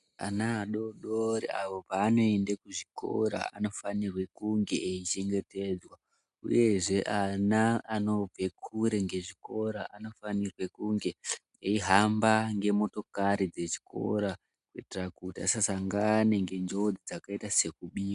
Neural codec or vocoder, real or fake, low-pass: none; real; 10.8 kHz